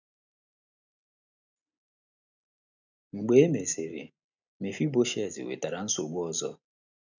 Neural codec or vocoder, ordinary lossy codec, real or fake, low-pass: none; none; real; 7.2 kHz